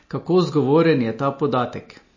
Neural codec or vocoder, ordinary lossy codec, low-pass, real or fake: none; MP3, 32 kbps; 7.2 kHz; real